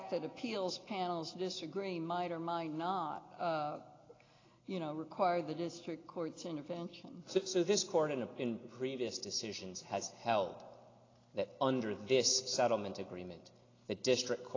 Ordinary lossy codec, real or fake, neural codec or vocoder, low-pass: AAC, 32 kbps; real; none; 7.2 kHz